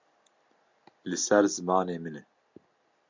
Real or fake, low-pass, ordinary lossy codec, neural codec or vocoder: real; 7.2 kHz; MP3, 64 kbps; none